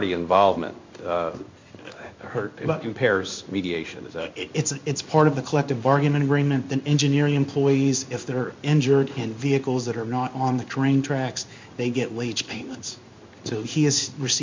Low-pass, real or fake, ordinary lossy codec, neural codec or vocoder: 7.2 kHz; fake; MP3, 64 kbps; codec, 16 kHz in and 24 kHz out, 1 kbps, XY-Tokenizer